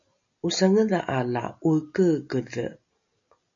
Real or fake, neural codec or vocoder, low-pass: real; none; 7.2 kHz